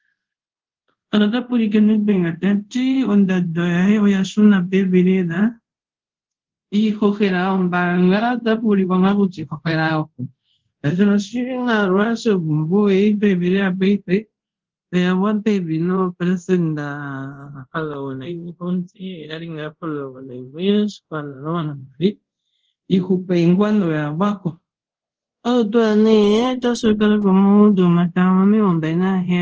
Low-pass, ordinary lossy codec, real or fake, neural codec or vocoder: 7.2 kHz; Opus, 16 kbps; fake; codec, 24 kHz, 0.5 kbps, DualCodec